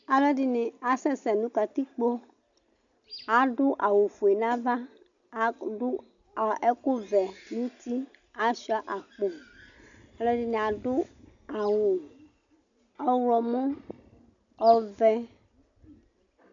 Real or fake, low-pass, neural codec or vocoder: real; 7.2 kHz; none